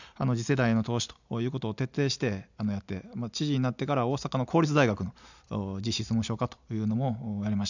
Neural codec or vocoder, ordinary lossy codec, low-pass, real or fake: none; none; 7.2 kHz; real